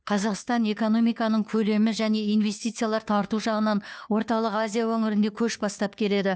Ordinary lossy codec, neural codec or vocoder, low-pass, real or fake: none; codec, 16 kHz, 2 kbps, FunCodec, trained on Chinese and English, 25 frames a second; none; fake